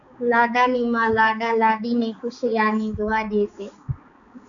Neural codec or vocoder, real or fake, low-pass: codec, 16 kHz, 4 kbps, X-Codec, HuBERT features, trained on balanced general audio; fake; 7.2 kHz